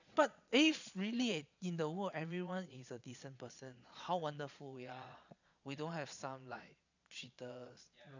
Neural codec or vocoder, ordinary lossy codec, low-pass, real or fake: vocoder, 22.05 kHz, 80 mel bands, Vocos; none; 7.2 kHz; fake